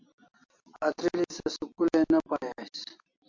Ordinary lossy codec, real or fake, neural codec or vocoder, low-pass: MP3, 48 kbps; real; none; 7.2 kHz